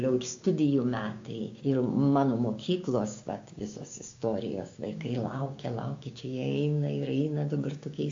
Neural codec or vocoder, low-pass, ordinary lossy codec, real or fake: codec, 16 kHz, 6 kbps, DAC; 7.2 kHz; AAC, 48 kbps; fake